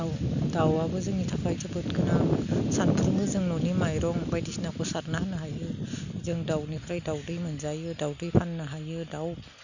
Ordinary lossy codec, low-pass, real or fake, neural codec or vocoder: none; 7.2 kHz; real; none